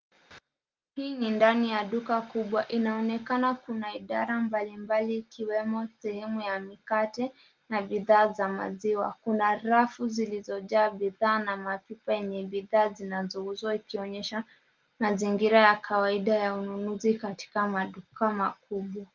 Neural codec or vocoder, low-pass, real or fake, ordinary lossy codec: none; 7.2 kHz; real; Opus, 32 kbps